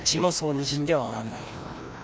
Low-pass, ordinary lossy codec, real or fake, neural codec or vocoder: none; none; fake; codec, 16 kHz, 1 kbps, FreqCodec, larger model